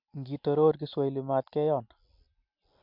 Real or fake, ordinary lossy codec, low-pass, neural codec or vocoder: real; MP3, 48 kbps; 5.4 kHz; none